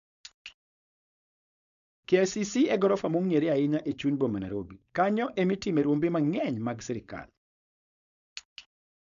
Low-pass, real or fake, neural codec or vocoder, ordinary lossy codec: 7.2 kHz; fake; codec, 16 kHz, 4.8 kbps, FACodec; MP3, 96 kbps